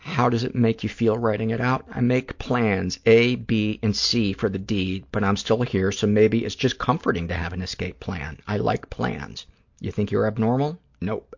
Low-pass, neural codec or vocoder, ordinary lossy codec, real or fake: 7.2 kHz; none; MP3, 48 kbps; real